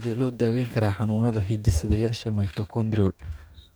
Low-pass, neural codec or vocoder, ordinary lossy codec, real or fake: none; codec, 44.1 kHz, 2.6 kbps, DAC; none; fake